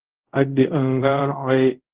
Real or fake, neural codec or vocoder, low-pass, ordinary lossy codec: fake; codec, 24 kHz, 0.5 kbps, DualCodec; 3.6 kHz; Opus, 16 kbps